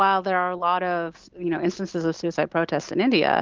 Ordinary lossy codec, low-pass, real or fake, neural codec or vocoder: Opus, 32 kbps; 7.2 kHz; real; none